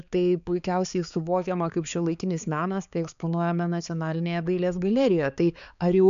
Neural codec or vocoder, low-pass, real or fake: codec, 16 kHz, 4 kbps, X-Codec, HuBERT features, trained on balanced general audio; 7.2 kHz; fake